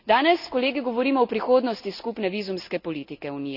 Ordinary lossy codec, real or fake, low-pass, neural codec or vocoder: none; real; 5.4 kHz; none